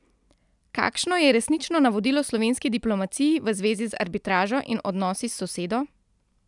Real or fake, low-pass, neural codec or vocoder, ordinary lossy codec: real; 10.8 kHz; none; none